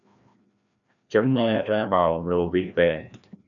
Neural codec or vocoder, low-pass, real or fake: codec, 16 kHz, 1 kbps, FreqCodec, larger model; 7.2 kHz; fake